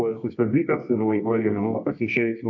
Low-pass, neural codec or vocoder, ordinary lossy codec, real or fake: 7.2 kHz; codec, 24 kHz, 0.9 kbps, WavTokenizer, medium music audio release; AAC, 48 kbps; fake